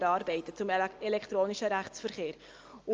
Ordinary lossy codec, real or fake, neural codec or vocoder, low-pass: Opus, 24 kbps; real; none; 7.2 kHz